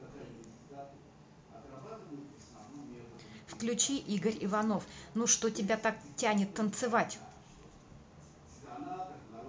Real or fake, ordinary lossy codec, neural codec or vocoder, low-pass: real; none; none; none